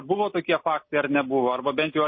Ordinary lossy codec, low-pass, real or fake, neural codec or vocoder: MP3, 24 kbps; 7.2 kHz; real; none